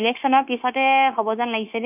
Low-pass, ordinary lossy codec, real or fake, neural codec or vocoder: 3.6 kHz; none; fake; codec, 16 kHz, 0.9 kbps, LongCat-Audio-Codec